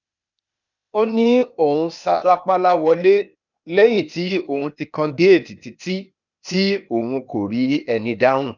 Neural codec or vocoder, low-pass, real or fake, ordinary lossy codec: codec, 16 kHz, 0.8 kbps, ZipCodec; 7.2 kHz; fake; none